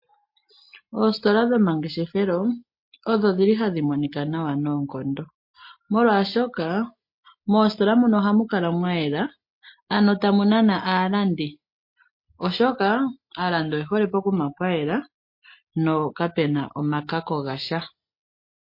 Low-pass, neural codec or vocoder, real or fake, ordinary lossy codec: 5.4 kHz; none; real; MP3, 32 kbps